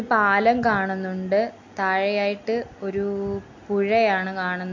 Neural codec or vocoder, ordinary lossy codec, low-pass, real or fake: none; AAC, 48 kbps; 7.2 kHz; real